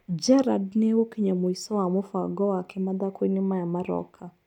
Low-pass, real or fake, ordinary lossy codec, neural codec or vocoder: 19.8 kHz; real; none; none